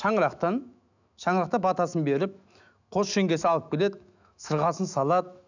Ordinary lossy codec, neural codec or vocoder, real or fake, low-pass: none; autoencoder, 48 kHz, 128 numbers a frame, DAC-VAE, trained on Japanese speech; fake; 7.2 kHz